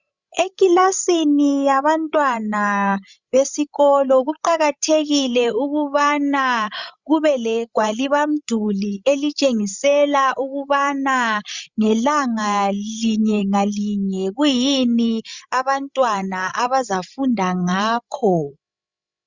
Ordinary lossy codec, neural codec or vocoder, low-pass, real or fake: Opus, 64 kbps; codec, 16 kHz, 16 kbps, FreqCodec, larger model; 7.2 kHz; fake